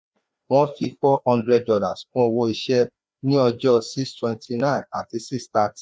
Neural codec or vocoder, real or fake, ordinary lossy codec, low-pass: codec, 16 kHz, 2 kbps, FreqCodec, larger model; fake; none; none